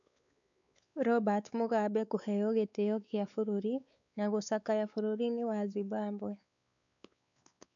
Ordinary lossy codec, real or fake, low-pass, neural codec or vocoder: none; fake; 7.2 kHz; codec, 16 kHz, 4 kbps, X-Codec, WavLM features, trained on Multilingual LibriSpeech